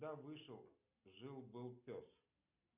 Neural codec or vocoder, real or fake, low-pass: none; real; 3.6 kHz